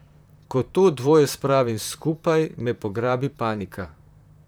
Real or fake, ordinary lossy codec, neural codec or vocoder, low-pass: fake; none; vocoder, 44.1 kHz, 128 mel bands, Pupu-Vocoder; none